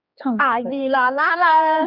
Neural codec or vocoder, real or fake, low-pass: codec, 16 kHz, 2 kbps, X-Codec, HuBERT features, trained on general audio; fake; 5.4 kHz